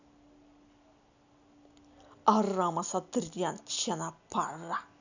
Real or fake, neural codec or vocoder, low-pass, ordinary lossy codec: real; none; 7.2 kHz; none